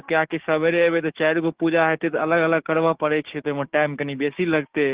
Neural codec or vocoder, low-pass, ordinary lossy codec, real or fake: codec, 16 kHz, 6 kbps, DAC; 3.6 kHz; Opus, 16 kbps; fake